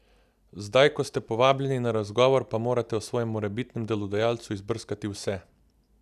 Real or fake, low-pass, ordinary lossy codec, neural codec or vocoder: real; 14.4 kHz; none; none